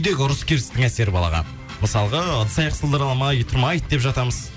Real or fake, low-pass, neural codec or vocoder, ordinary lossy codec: real; none; none; none